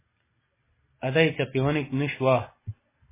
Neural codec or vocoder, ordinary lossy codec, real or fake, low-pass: none; MP3, 16 kbps; real; 3.6 kHz